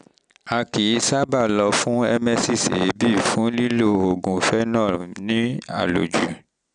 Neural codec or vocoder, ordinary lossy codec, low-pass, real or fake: none; none; 9.9 kHz; real